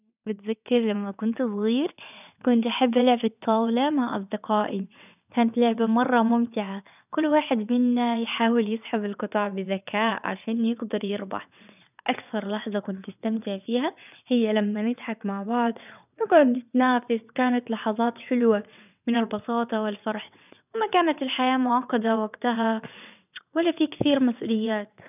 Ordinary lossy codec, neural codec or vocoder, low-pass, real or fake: none; vocoder, 22.05 kHz, 80 mel bands, WaveNeXt; 3.6 kHz; fake